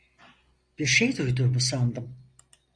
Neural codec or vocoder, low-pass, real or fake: none; 9.9 kHz; real